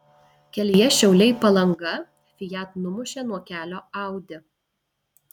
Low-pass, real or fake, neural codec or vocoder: 19.8 kHz; real; none